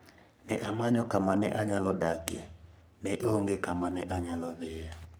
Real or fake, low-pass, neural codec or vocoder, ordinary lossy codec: fake; none; codec, 44.1 kHz, 3.4 kbps, Pupu-Codec; none